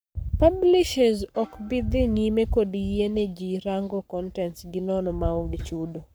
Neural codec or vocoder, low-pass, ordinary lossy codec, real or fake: codec, 44.1 kHz, 7.8 kbps, Pupu-Codec; none; none; fake